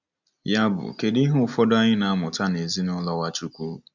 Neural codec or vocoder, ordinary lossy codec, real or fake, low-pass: none; none; real; 7.2 kHz